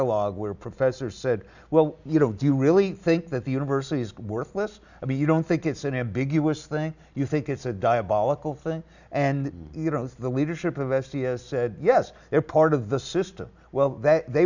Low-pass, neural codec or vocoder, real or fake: 7.2 kHz; none; real